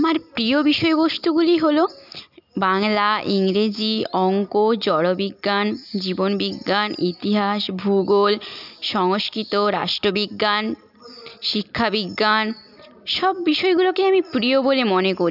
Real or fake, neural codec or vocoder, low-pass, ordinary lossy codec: real; none; 5.4 kHz; none